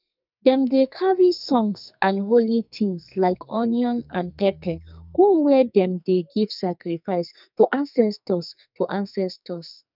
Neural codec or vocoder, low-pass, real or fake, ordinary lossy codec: codec, 44.1 kHz, 2.6 kbps, SNAC; 5.4 kHz; fake; none